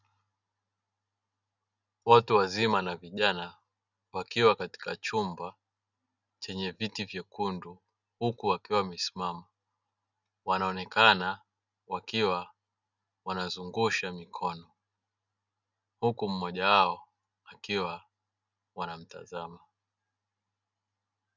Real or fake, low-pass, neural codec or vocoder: real; 7.2 kHz; none